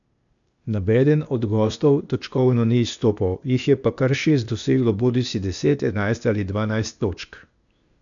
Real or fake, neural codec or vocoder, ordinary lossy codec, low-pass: fake; codec, 16 kHz, 0.8 kbps, ZipCodec; none; 7.2 kHz